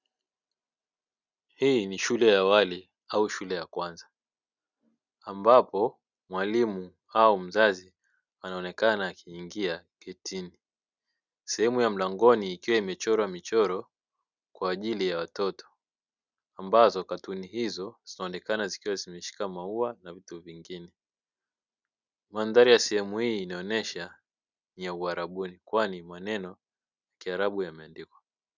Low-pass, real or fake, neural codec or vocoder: 7.2 kHz; real; none